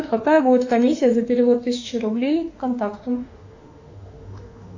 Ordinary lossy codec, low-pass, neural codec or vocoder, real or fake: AAC, 48 kbps; 7.2 kHz; autoencoder, 48 kHz, 32 numbers a frame, DAC-VAE, trained on Japanese speech; fake